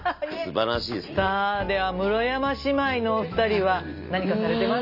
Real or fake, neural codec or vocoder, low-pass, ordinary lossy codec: real; none; 5.4 kHz; none